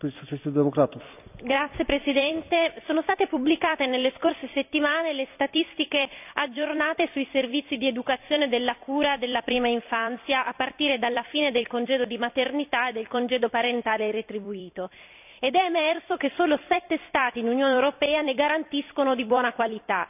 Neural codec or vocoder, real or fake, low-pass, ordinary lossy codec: vocoder, 44.1 kHz, 128 mel bands every 256 samples, BigVGAN v2; fake; 3.6 kHz; none